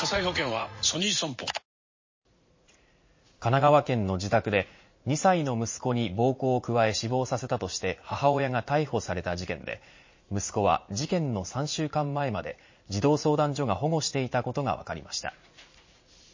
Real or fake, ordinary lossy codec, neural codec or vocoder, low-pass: fake; MP3, 32 kbps; vocoder, 44.1 kHz, 80 mel bands, Vocos; 7.2 kHz